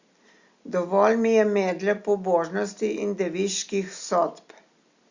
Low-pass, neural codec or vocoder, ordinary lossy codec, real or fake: 7.2 kHz; none; Opus, 64 kbps; real